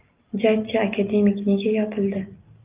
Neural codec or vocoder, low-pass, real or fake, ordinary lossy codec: none; 3.6 kHz; real; Opus, 24 kbps